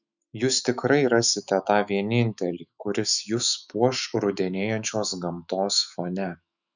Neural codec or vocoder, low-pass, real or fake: autoencoder, 48 kHz, 128 numbers a frame, DAC-VAE, trained on Japanese speech; 7.2 kHz; fake